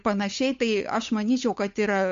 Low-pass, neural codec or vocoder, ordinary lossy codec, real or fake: 7.2 kHz; codec, 16 kHz, 8 kbps, FunCodec, trained on Chinese and English, 25 frames a second; MP3, 48 kbps; fake